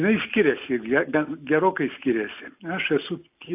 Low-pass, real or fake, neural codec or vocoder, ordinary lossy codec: 3.6 kHz; real; none; AAC, 32 kbps